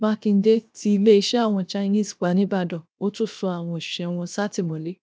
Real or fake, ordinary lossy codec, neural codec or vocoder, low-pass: fake; none; codec, 16 kHz, 0.7 kbps, FocalCodec; none